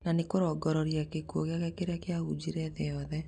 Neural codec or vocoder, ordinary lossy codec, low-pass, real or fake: none; none; 9.9 kHz; real